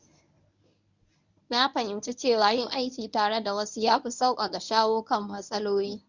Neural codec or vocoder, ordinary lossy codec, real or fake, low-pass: codec, 24 kHz, 0.9 kbps, WavTokenizer, medium speech release version 1; none; fake; 7.2 kHz